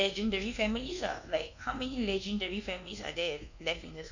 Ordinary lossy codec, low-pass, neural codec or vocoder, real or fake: none; 7.2 kHz; codec, 24 kHz, 1.2 kbps, DualCodec; fake